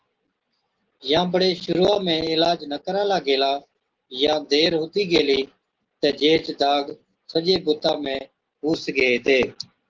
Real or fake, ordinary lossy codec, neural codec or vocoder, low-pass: real; Opus, 16 kbps; none; 7.2 kHz